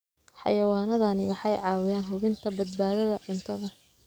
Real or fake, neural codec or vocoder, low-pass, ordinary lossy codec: fake; codec, 44.1 kHz, 7.8 kbps, DAC; none; none